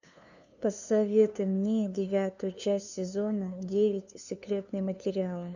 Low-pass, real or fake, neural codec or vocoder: 7.2 kHz; fake; codec, 16 kHz, 2 kbps, FunCodec, trained on LibriTTS, 25 frames a second